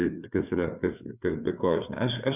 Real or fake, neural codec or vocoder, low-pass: fake; codec, 16 kHz, 4 kbps, FreqCodec, larger model; 3.6 kHz